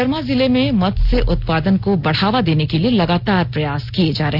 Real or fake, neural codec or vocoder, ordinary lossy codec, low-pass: real; none; none; 5.4 kHz